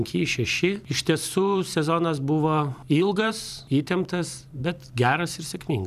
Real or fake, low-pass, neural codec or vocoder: fake; 14.4 kHz; vocoder, 44.1 kHz, 128 mel bands every 512 samples, BigVGAN v2